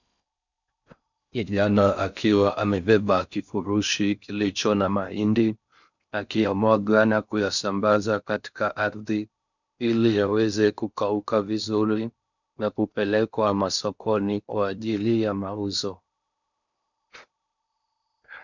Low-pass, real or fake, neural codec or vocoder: 7.2 kHz; fake; codec, 16 kHz in and 24 kHz out, 0.6 kbps, FocalCodec, streaming, 4096 codes